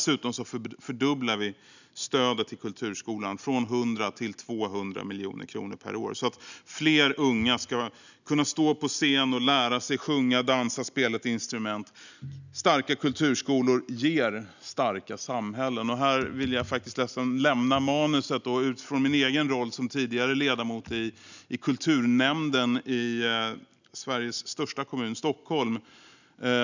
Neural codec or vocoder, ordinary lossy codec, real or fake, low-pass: none; none; real; 7.2 kHz